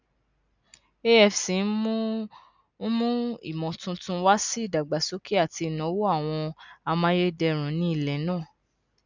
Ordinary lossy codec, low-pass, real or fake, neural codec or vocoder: none; 7.2 kHz; real; none